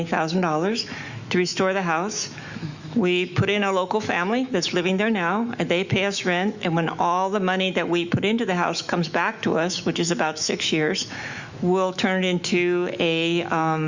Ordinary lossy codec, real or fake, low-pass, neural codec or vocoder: Opus, 64 kbps; fake; 7.2 kHz; autoencoder, 48 kHz, 128 numbers a frame, DAC-VAE, trained on Japanese speech